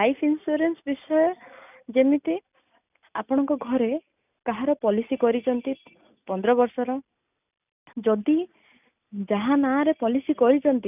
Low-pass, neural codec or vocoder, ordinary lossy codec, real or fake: 3.6 kHz; none; none; real